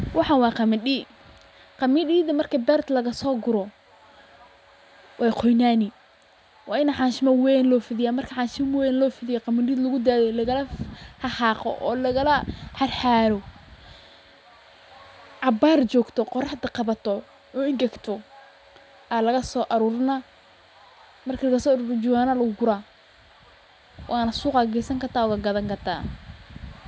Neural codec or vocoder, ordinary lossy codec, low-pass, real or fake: none; none; none; real